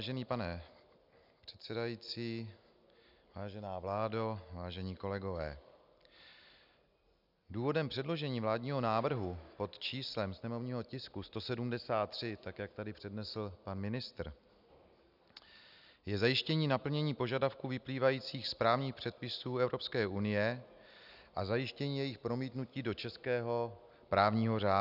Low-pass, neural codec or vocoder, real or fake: 5.4 kHz; none; real